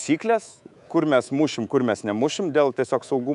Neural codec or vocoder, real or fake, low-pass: codec, 24 kHz, 3.1 kbps, DualCodec; fake; 10.8 kHz